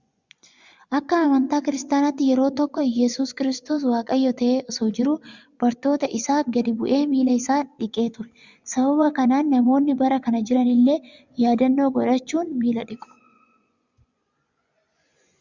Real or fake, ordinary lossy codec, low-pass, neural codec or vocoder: fake; Opus, 64 kbps; 7.2 kHz; vocoder, 24 kHz, 100 mel bands, Vocos